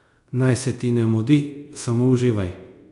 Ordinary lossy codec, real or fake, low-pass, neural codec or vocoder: MP3, 64 kbps; fake; 10.8 kHz; codec, 24 kHz, 0.5 kbps, DualCodec